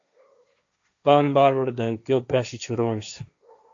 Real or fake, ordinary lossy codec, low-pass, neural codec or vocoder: fake; AAC, 64 kbps; 7.2 kHz; codec, 16 kHz, 1.1 kbps, Voila-Tokenizer